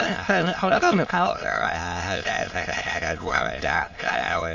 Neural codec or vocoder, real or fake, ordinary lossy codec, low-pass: autoencoder, 22.05 kHz, a latent of 192 numbers a frame, VITS, trained on many speakers; fake; MP3, 48 kbps; 7.2 kHz